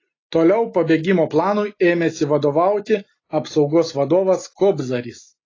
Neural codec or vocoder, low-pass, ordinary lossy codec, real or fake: none; 7.2 kHz; AAC, 32 kbps; real